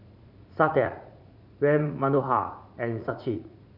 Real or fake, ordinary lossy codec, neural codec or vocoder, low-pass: fake; none; vocoder, 44.1 kHz, 80 mel bands, Vocos; 5.4 kHz